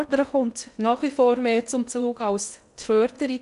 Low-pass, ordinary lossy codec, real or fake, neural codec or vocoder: 10.8 kHz; none; fake; codec, 16 kHz in and 24 kHz out, 0.6 kbps, FocalCodec, streaming, 2048 codes